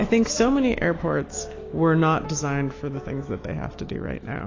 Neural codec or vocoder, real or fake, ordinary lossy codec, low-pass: autoencoder, 48 kHz, 128 numbers a frame, DAC-VAE, trained on Japanese speech; fake; AAC, 32 kbps; 7.2 kHz